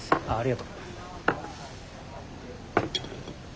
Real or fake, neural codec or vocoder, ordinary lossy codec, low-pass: real; none; none; none